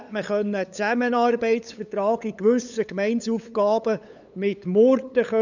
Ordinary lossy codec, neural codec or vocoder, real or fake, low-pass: none; codec, 16 kHz, 8 kbps, FunCodec, trained on LibriTTS, 25 frames a second; fake; 7.2 kHz